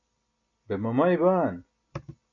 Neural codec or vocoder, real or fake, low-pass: none; real; 7.2 kHz